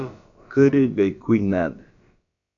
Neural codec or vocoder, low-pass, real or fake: codec, 16 kHz, about 1 kbps, DyCAST, with the encoder's durations; 7.2 kHz; fake